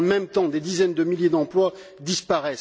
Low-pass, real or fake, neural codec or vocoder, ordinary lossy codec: none; real; none; none